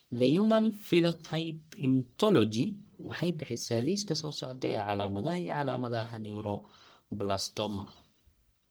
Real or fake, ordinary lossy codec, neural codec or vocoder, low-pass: fake; none; codec, 44.1 kHz, 1.7 kbps, Pupu-Codec; none